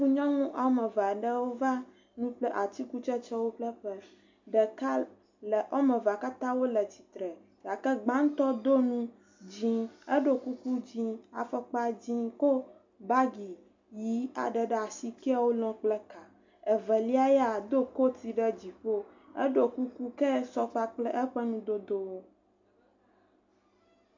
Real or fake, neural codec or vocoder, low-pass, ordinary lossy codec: real; none; 7.2 kHz; MP3, 64 kbps